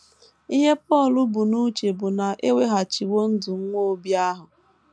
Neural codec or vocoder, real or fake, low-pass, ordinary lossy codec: none; real; none; none